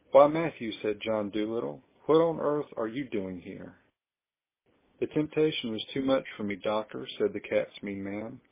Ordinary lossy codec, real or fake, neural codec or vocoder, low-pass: MP3, 16 kbps; real; none; 3.6 kHz